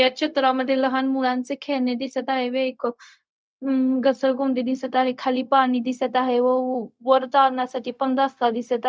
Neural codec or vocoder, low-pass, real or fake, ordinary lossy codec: codec, 16 kHz, 0.4 kbps, LongCat-Audio-Codec; none; fake; none